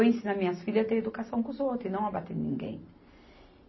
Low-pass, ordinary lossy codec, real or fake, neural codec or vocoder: 7.2 kHz; MP3, 24 kbps; real; none